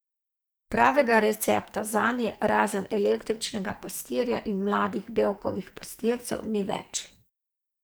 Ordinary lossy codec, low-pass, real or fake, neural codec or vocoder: none; none; fake; codec, 44.1 kHz, 2.6 kbps, SNAC